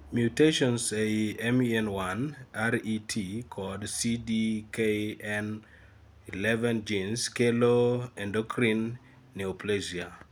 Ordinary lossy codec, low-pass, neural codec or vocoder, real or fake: none; none; none; real